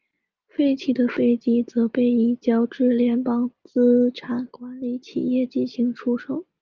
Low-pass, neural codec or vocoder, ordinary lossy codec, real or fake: 7.2 kHz; none; Opus, 24 kbps; real